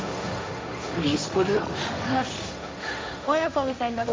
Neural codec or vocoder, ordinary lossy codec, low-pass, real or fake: codec, 16 kHz, 1.1 kbps, Voila-Tokenizer; none; none; fake